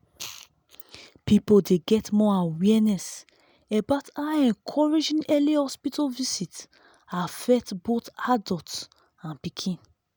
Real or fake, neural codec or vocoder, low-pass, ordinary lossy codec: real; none; none; none